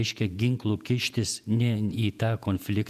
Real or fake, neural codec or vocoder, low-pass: fake; vocoder, 48 kHz, 128 mel bands, Vocos; 14.4 kHz